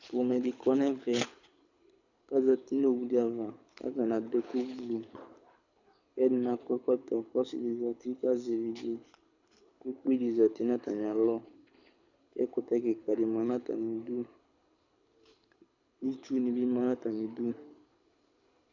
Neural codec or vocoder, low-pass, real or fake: codec, 24 kHz, 6 kbps, HILCodec; 7.2 kHz; fake